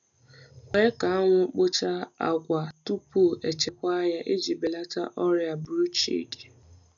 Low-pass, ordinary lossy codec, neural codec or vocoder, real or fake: 7.2 kHz; none; none; real